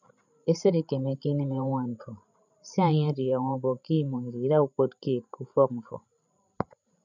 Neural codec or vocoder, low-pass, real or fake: codec, 16 kHz, 16 kbps, FreqCodec, larger model; 7.2 kHz; fake